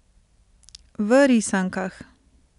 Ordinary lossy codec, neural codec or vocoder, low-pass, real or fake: none; none; 10.8 kHz; real